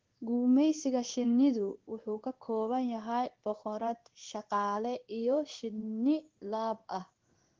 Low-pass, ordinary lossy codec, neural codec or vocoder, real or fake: 7.2 kHz; Opus, 16 kbps; codec, 16 kHz in and 24 kHz out, 1 kbps, XY-Tokenizer; fake